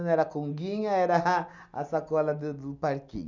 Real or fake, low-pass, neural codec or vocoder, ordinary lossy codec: real; 7.2 kHz; none; none